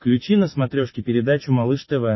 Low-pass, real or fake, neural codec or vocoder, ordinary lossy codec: 7.2 kHz; real; none; MP3, 24 kbps